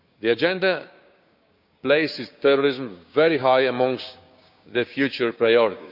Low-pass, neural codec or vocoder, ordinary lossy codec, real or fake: 5.4 kHz; codec, 16 kHz, 6 kbps, DAC; none; fake